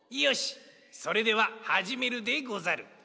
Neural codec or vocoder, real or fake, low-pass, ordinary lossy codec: none; real; none; none